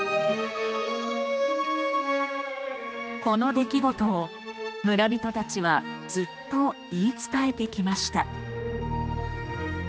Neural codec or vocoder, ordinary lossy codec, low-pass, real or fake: codec, 16 kHz, 2 kbps, X-Codec, HuBERT features, trained on general audio; none; none; fake